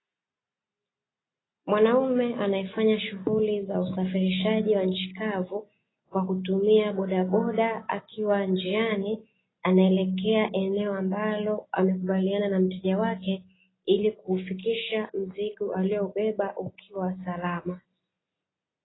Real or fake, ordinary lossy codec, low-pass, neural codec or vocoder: real; AAC, 16 kbps; 7.2 kHz; none